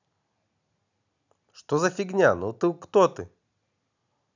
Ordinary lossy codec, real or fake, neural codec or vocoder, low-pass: none; real; none; 7.2 kHz